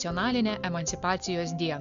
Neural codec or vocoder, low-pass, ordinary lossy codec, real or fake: none; 7.2 kHz; MP3, 64 kbps; real